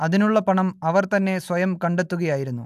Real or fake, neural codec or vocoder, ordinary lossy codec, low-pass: real; none; AAC, 96 kbps; 14.4 kHz